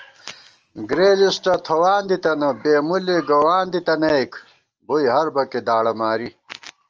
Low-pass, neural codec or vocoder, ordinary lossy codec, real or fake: 7.2 kHz; none; Opus, 24 kbps; real